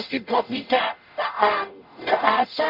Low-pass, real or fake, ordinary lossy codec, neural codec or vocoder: 5.4 kHz; fake; none; codec, 44.1 kHz, 0.9 kbps, DAC